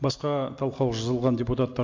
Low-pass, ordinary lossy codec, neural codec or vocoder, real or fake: 7.2 kHz; MP3, 48 kbps; none; real